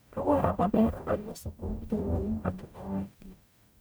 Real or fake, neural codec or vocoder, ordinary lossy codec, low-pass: fake; codec, 44.1 kHz, 0.9 kbps, DAC; none; none